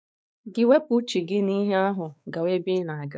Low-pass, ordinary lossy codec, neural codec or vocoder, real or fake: none; none; codec, 16 kHz, 4 kbps, X-Codec, WavLM features, trained on Multilingual LibriSpeech; fake